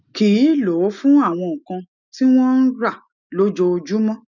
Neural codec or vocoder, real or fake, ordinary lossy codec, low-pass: none; real; none; 7.2 kHz